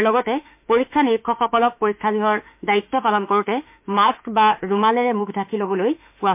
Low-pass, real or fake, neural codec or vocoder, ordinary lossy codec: 3.6 kHz; fake; autoencoder, 48 kHz, 32 numbers a frame, DAC-VAE, trained on Japanese speech; MP3, 32 kbps